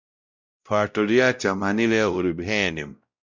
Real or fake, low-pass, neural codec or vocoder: fake; 7.2 kHz; codec, 16 kHz, 0.5 kbps, X-Codec, WavLM features, trained on Multilingual LibriSpeech